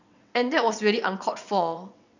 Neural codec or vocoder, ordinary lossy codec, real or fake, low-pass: vocoder, 22.05 kHz, 80 mel bands, WaveNeXt; none; fake; 7.2 kHz